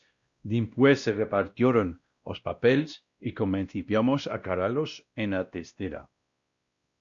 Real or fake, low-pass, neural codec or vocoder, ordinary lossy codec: fake; 7.2 kHz; codec, 16 kHz, 1 kbps, X-Codec, WavLM features, trained on Multilingual LibriSpeech; Opus, 64 kbps